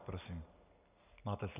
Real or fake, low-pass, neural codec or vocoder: real; 3.6 kHz; none